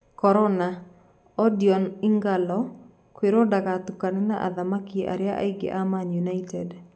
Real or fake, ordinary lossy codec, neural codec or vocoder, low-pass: real; none; none; none